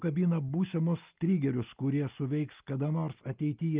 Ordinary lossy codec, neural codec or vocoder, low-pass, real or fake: Opus, 24 kbps; none; 3.6 kHz; real